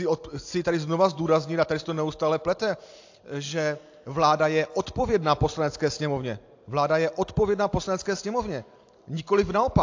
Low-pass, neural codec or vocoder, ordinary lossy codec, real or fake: 7.2 kHz; none; AAC, 48 kbps; real